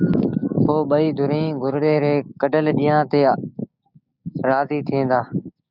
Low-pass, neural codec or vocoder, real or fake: 5.4 kHz; codec, 16 kHz, 6 kbps, DAC; fake